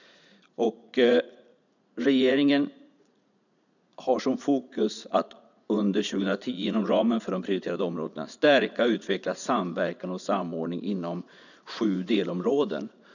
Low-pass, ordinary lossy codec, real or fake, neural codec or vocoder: 7.2 kHz; none; fake; vocoder, 44.1 kHz, 80 mel bands, Vocos